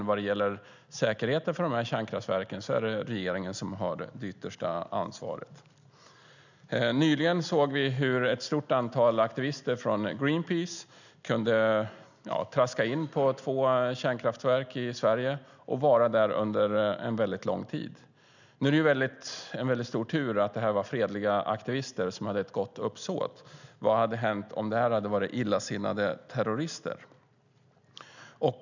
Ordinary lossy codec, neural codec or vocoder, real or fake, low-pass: none; none; real; 7.2 kHz